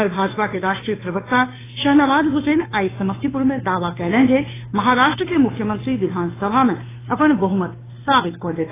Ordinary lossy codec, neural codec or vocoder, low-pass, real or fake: AAC, 16 kbps; codec, 16 kHz, 2 kbps, FunCodec, trained on Chinese and English, 25 frames a second; 3.6 kHz; fake